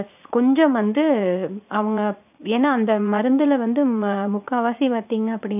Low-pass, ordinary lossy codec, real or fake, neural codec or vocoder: 3.6 kHz; AAC, 32 kbps; fake; vocoder, 44.1 kHz, 80 mel bands, Vocos